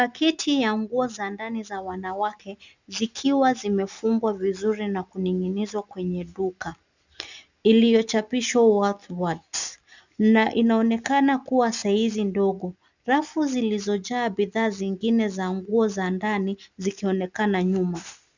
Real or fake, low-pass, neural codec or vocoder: real; 7.2 kHz; none